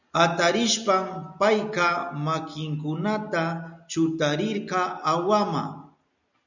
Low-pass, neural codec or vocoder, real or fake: 7.2 kHz; none; real